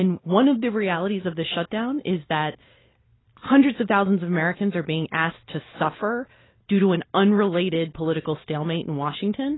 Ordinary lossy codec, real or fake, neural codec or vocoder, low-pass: AAC, 16 kbps; real; none; 7.2 kHz